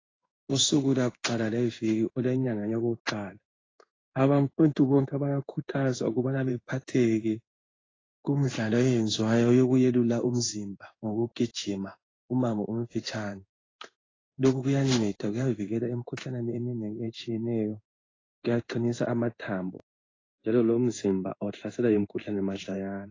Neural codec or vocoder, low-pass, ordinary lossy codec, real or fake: codec, 16 kHz in and 24 kHz out, 1 kbps, XY-Tokenizer; 7.2 kHz; AAC, 32 kbps; fake